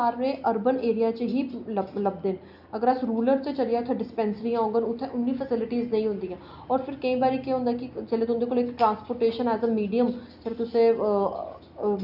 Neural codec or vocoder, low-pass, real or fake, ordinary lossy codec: none; 5.4 kHz; real; none